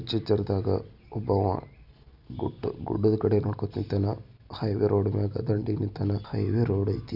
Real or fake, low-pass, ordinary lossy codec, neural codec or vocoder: real; 5.4 kHz; none; none